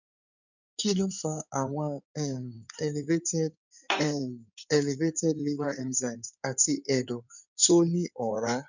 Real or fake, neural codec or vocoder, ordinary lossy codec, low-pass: fake; codec, 16 kHz in and 24 kHz out, 2.2 kbps, FireRedTTS-2 codec; none; 7.2 kHz